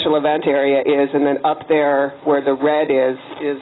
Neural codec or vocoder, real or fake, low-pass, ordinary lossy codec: none; real; 7.2 kHz; AAC, 16 kbps